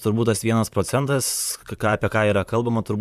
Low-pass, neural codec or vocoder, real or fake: 14.4 kHz; vocoder, 44.1 kHz, 128 mel bands, Pupu-Vocoder; fake